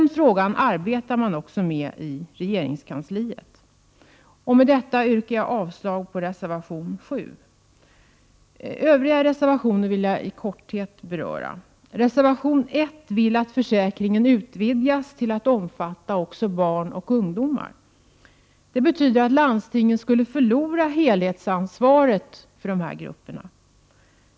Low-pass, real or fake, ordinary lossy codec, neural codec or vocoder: none; real; none; none